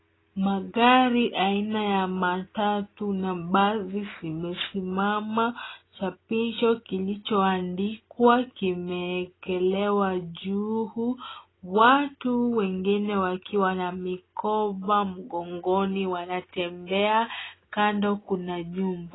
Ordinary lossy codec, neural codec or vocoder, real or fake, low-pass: AAC, 16 kbps; none; real; 7.2 kHz